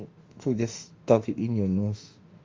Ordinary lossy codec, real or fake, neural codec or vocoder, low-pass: Opus, 32 kbps; fake; autoencoder, 48 kHz, 32 numbers a frame, DAC-VAE, trained on Japanese speech; 7.2 kHz